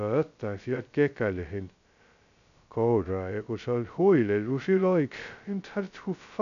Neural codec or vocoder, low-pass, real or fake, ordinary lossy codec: codec, 16 kHz, 0.2 kbps, FocalCodec; 7.2 kHz; fake; none